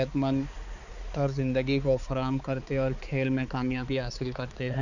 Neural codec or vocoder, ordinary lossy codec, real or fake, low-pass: codec, 16 kHz, 4 kbps, X-Codec, HuBERT features, trained on balanced general audio; none; fake; 7.2 kHz